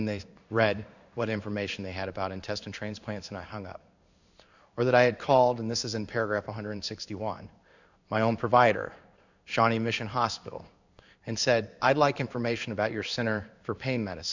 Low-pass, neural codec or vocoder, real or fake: 7.2 kHz; codec, 16 kHz in and 24 kHz out, 1 kbps, XY-Tokenizer; fake